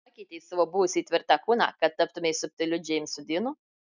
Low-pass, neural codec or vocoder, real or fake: 7.2 kHz; none; real